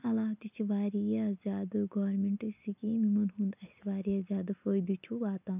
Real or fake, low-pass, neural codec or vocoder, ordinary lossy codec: real; 3.6 kHz; none; none